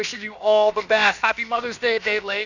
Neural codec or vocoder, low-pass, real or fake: codec, 16 kHz, about 1 kbps, DyCAST, with the encoder's durations; 7.2 kHz; fake